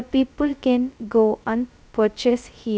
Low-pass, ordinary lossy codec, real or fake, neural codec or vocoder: none; none; fake; codec, 16 kHz, 0.2 kbps, FocalCodec